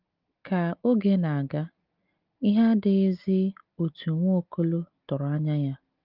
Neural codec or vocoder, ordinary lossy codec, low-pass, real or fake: none; Opus, 32 kbps; 5.4 kHz; real